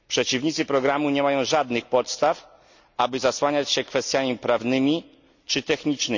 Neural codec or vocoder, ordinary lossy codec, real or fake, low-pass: none; none; real; 7.2 kHz